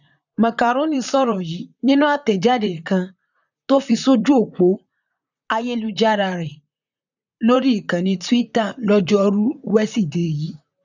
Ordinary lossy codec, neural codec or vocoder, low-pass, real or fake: none; vocoder, 44.1 kHz, 128 mel bands, Pupu-Vocoder; 7.2 kHz; fake